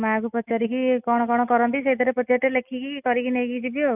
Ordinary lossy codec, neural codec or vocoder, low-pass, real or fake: none; none; 3.6 kHz; real